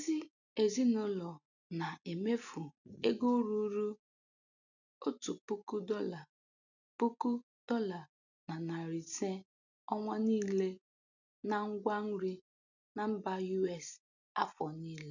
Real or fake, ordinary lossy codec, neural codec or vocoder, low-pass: real; none; none; 7.2 kHz